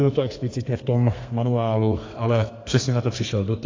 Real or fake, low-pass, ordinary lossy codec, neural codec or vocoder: fake; 7.2 kHz; AAC, 32 kbps; codec, 32 kHz, 1.9 kbps, SNAC